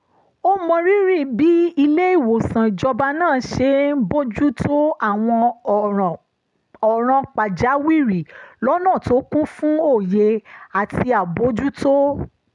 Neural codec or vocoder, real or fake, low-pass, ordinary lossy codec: none; real; 10.8 kHz; none